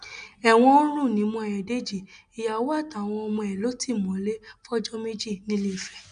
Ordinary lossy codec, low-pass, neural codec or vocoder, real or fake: none; 9.9 kHz; none; real